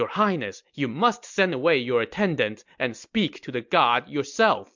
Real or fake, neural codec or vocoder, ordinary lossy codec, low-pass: real; none; MP3, 64 kbps; 7.2 kHz